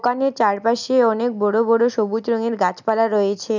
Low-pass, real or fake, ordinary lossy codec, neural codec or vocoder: 7.2 kHz; real; none; none